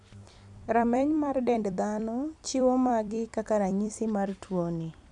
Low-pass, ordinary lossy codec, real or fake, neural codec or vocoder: 10.8 kHz; none; fake; vocoder, 44.1 kHz, 128 mel bands every 256 samples, BigVGAN v2